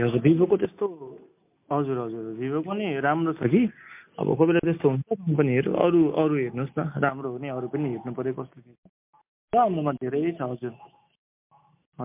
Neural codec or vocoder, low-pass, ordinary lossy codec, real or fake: none; 3.6 kHz; MP3, 32 kbps; real